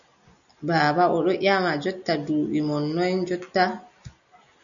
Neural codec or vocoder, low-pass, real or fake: none; 7.2 kHz; real